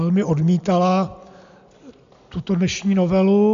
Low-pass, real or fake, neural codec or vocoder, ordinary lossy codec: 7.2 kHz; real; none; MP3, 64 kbps